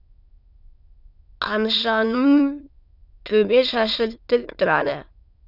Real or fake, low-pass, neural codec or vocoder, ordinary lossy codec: fake; 5.4 kHz; autoencoder, 22.05 kHz, a latent of 192 numbers a frame, VITS, trained on many speakers; none